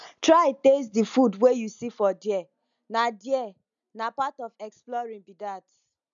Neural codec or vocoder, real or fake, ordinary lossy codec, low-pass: none; real; none; 7.2 kHz